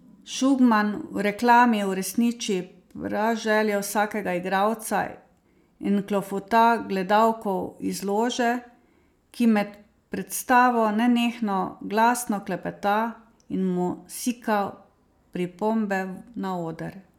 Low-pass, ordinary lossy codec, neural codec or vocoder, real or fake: 19.8 kHz; none; none; real